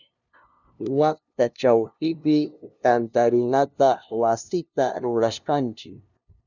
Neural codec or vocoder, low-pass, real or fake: codec, 16 kHz, 0.5 kbps, FunCodec, trained on LibriTTS, 25 frames a second; 7.2 kHz; fake